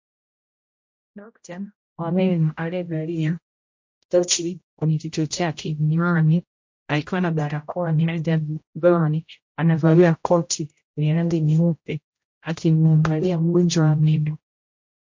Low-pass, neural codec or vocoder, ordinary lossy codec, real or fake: 7.2 kHz; codec, 16 kHz, 0.5 kbps, X-Codec, HuBERT features, trained on general audio; MP3, 48 kbps; fake